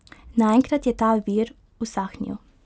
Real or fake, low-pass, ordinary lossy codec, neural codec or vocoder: real; none; none; none